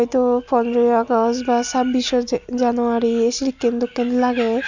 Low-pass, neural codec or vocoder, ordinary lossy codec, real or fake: 7.2 kHz; none; none; real